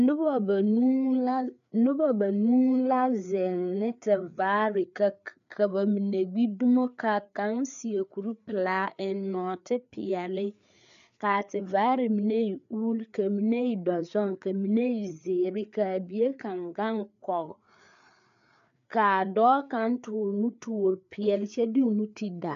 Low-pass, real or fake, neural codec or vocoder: 7.2 kHz; fake; codec, 16 kHz, 4 kbps, FreqCodec, larger model